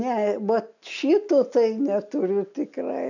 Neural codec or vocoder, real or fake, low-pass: none; real; 7.2 kHz